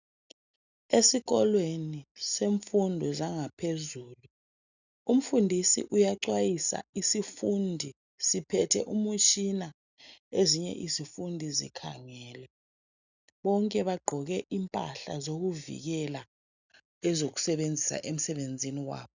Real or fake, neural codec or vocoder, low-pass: real; none; 7.2 kHz